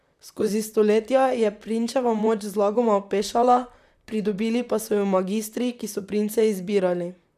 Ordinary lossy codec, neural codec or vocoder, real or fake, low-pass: none; vocoder, 44.1 kHz, 128 mel bands, Pupu-Vocoder; fake; 14.4 kHz